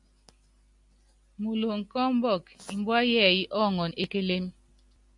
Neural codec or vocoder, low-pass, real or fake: none; 10.8 kHz; real